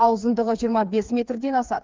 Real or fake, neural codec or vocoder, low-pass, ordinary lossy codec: fake; codec, 16 kHz, 4 kbps, FreqCodec, smaller model; 7.2 kHz; Opus, 24 kbps